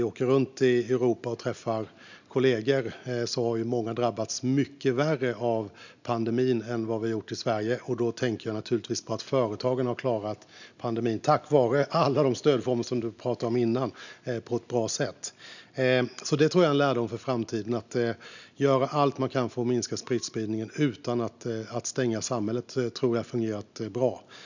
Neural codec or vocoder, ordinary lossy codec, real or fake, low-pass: none; none; real; 7.2 kHz